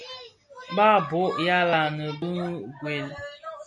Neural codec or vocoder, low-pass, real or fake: none; 10.8 kHz; real